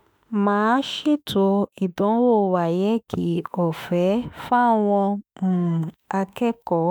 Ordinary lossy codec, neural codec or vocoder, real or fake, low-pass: none; autoencoder, 48 kHz, 32 numbers a frame, DAC-VAE, trained on Japanese speech; fake; none